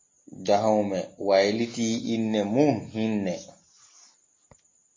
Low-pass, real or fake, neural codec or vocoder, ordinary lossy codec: 7.2 kHz; real; none; MP3, 32 kbps